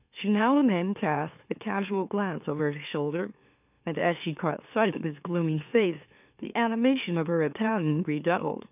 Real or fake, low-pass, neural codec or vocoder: fake; 3.6 kHz; autoencoder, 44.1 kHz, a latent of 192 numbers a frame, MeloTTS